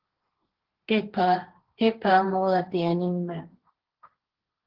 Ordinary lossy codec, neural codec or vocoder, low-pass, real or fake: Opus, 16 kbps; codec, 16 kHz, 1.1 kbps, Voila-Tokenizer; 5.4 kHz; fake